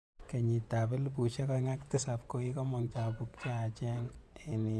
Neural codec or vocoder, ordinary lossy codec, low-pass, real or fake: vocoder, 24 kHz, 100 mel bands, Vocos; none; none; fake